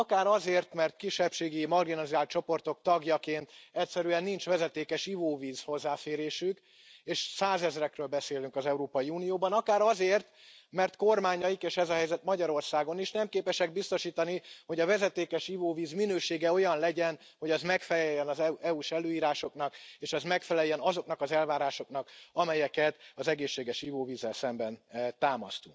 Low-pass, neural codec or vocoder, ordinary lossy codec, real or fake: none; none; none; real